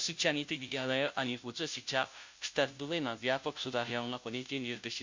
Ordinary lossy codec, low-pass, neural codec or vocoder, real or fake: MP3, 64 kbps; 7.2 kHz; codec, 16 kHz, 0.5 kbps, FunCodec, trained on Chinese and English, 25 frames a second; fake